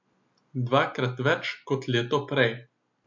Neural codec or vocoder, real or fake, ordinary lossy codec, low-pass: none; real; MP3, 48 kbps; 7.2 kHz